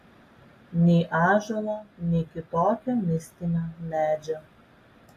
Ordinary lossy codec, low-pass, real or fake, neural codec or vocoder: AAC, 48 kbps; 14.4 kHz; real; none